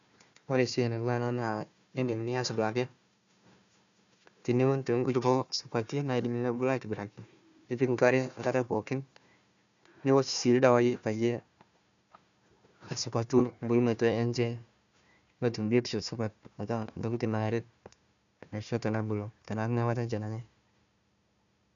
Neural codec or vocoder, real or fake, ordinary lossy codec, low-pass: codec, 16 kHz, 1 kbps, FunCodec, trained on Chinese and English, 50 frames a second; fake; none; 7.2 kHz